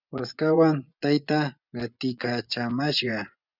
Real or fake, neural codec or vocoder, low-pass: fake; vocoder, 24 kHz, 100 mel bands, Vocos; 5.4 kHz